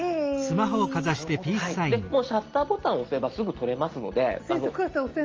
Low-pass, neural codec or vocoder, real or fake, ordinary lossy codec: 7.2 kHz; none; real; Opus, 24 kbps